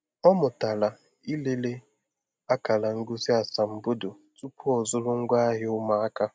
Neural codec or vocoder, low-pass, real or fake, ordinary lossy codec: none; none; real; none